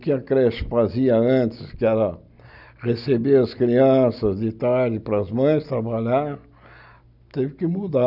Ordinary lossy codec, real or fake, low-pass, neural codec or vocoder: none; real; 5.4 kHz; none